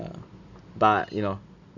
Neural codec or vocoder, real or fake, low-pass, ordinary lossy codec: none; real; 7.2 kHz; none